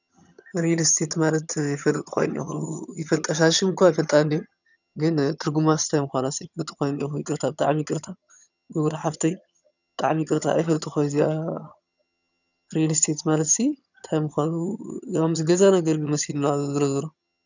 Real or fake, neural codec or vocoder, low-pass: fake; vocoder, 22.05 kHz, 80 mel bands, HiFi-GAN; 7.2 kHz